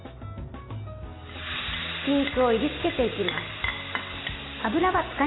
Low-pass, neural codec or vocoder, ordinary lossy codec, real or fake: 7.2 kHz; none; AAC, 16 kbps; real